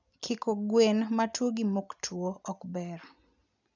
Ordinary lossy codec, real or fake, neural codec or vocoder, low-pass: none; real; none; 7.2 kHz